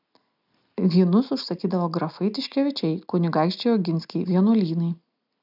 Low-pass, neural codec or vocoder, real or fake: 5.4 kHz; none; real